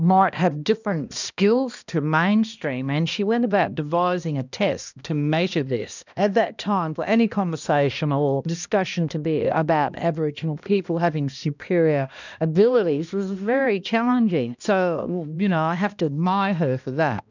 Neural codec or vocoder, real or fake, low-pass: codec, 16 kHz, 1 kbps, X-Codec, HuBERT features, trained on balanced general audio; fake; 7.2 kHz